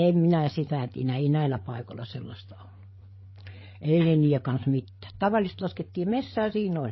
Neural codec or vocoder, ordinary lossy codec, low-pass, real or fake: codec, 16 kHz, 8 kbps, FreqCodec, larger model; MP3, 24 kbps; 7.2 kHz; fake